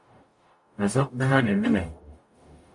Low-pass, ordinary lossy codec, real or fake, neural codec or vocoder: 10.8 kHz; MP3, 48 kbps; fake; codec, 44.1 kHz, 0.9 kbps, DAC